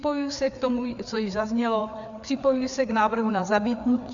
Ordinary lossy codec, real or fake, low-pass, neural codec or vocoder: MP3, 96 kbps; fake; 7.2 kHz; codec, 16 kHz, 4 kbps, FreqCodec, larger model